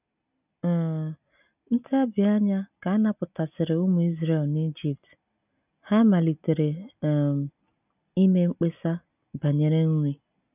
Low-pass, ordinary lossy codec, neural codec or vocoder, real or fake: 3.6 kHz; none; none; real